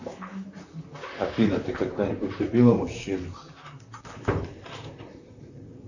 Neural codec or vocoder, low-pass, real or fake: vocoder, 44.1 kHz, 128 mel bands, Pupu-Vocoder; 7.2 kHz; fake